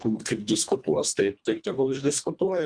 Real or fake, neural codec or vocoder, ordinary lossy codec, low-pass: fake; codec, 24 kHz, 1.5 kbps, HILCodec; Opus, 64 kbps; 9.9 kHz